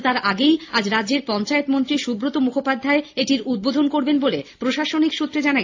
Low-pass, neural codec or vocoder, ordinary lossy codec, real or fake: 7.2 kHz; none; AAC, 48 kbps; real